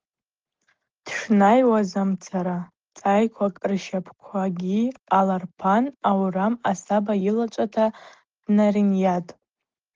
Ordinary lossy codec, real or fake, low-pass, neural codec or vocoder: Opus, 16 kbps; real; 7.2 kHz; none